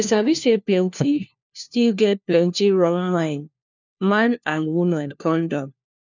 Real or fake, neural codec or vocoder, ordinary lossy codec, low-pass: fake; codec, 16 kHz, 1 kbps, FunCodec, trained on LibriTTS, 50 frames a second; none; 7.2 kHz